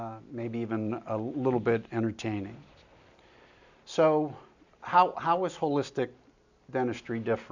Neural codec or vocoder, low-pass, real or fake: none; 7.2 kHz; real